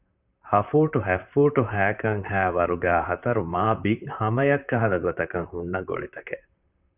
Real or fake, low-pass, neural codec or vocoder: fake; 3.6 kHz; vocoder, 44.1 kHz, 128 mel bands, Pupu-Vocoder